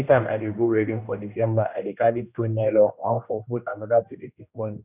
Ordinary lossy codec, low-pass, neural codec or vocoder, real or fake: none; 3.6 kHz; codec, 16 kHz, 1 kbps, X-Codec, HuBERT features, trained on general audio; fake